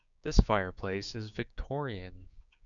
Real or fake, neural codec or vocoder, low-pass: fake; codec, 16 kHz, 6 kbps, DAC; 7.2 kHz